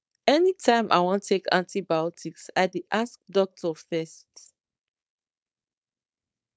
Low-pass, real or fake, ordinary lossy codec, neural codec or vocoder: none; fake; none; codec, 16 kHz, 4.8 kbps, FACodec